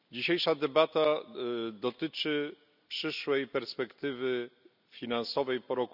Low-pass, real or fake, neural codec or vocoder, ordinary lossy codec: 5.4 kHz; real; none; none